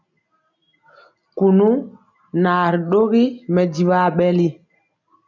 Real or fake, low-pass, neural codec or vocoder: real; 7.2 kHz; none